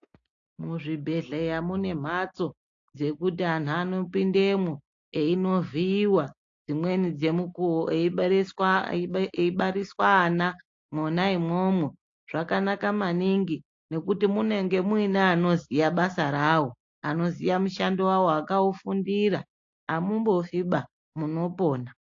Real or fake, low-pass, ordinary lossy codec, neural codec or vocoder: real; 7.2 kHz; AAC, 48 kbps; none